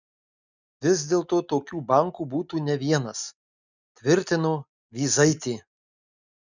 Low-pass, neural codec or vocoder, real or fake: 7.2 kHz; none; real